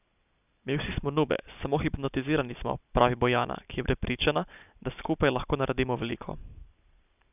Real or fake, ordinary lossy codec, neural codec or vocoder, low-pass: real; none; none; 3.6 kHz